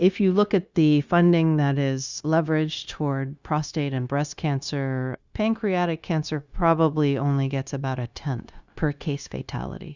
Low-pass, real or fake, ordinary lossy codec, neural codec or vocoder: 7.2 kHz; fake; Opus, 64 kbps; codec, 16 kHz, 0.9 kbps, LongCat-Audio-Codec